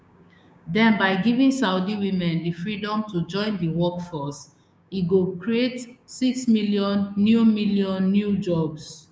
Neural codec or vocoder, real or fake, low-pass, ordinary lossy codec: codec, 16 kHz, 6 kbps, DAC; fake; none; none